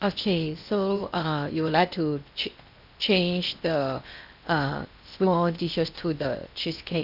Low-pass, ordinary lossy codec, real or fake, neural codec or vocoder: 5.4 kHz; none; fake; codec, 16 kHz in and 24 kHz out, 0.8 kbps, FocalCodec, streaming, 65536 codes